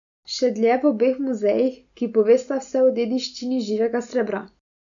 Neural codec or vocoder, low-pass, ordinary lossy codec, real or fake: none; 7.2 kHz; none; real